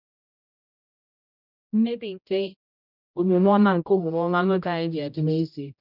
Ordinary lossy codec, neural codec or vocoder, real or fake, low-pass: none; codec, 16 kHz, 0.5 kbps, X-Codec, HuBERT features, trained on general audio; fake; 5.4 kHz